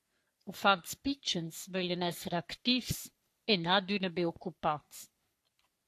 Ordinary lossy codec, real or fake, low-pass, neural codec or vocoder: AAC, 64 kbps; fake; 14.4 kHz; codec, 44.1 kHz, 3.4 kbps, Pupu-Codec